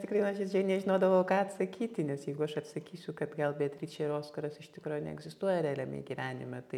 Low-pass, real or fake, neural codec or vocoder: 19.8 kHz; real; none